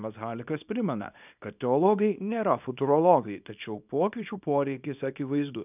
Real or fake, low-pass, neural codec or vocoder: fake; 3.6 kHz; codec, 24 kHz, 0.9 kbps, WavTokenizer, small release